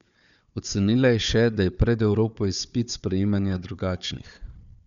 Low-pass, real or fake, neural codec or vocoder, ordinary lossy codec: 7.2 kHz; fake; codec, 16 kHz, 4 kbps, FunCodec, trained on Chinese and English, 50 frames a second; none